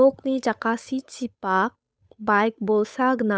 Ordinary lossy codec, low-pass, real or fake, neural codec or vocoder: none; none; fake; codec, 16 kHz, 8 kbps, FunCodec, trained on Chinese and English, 25 frames a second